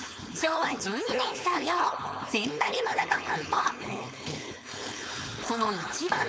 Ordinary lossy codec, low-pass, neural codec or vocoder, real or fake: none; none; codec, 16 kHz, 4.8 kbps, FACodec; fake